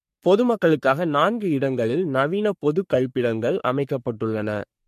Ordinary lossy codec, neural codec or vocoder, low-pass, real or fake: MP3, 64 kbps; codec, 44.1 kHz, 3.4 kbps, Pupu-Codec; 14.4 kHz; fake